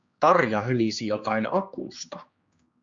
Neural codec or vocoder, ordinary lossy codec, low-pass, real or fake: codec, 16 kHz, 2 kbps, X-Codec, HuBERT features, trained on general audio; Opus, 64 kbps; 7.2 kHz; fake